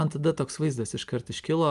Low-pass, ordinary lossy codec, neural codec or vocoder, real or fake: 10.8 kHz; Opus, 32 kbps; none; real